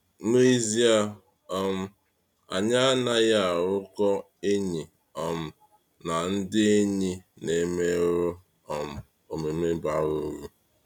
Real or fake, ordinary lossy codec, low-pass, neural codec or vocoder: real; none; 19.8 kHz; none